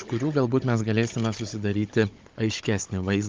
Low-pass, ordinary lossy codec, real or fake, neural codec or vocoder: 7.2 kHz; Opus, 32 kbps; fake; codec, 16 kHz, 16 kbps, FunCodec, trained on Chinese and English, 50 frames a second